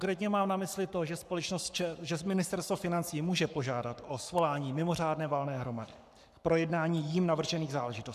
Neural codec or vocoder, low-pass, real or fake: codec, 44.1 kHz, 7.8 kbps, Pupu-Codec; 14.4 kHz; fake